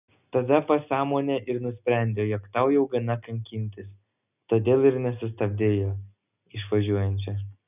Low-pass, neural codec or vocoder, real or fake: 3.6 kHz; none; real